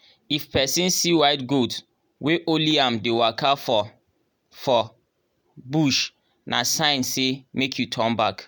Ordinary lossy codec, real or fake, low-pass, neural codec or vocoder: none; real; none; none